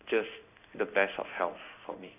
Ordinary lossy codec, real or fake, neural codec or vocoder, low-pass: none; fake; codec, 16 kHz in and 24 kHz out, 1 kbps, XY-Tokenizer; 3.6 kHz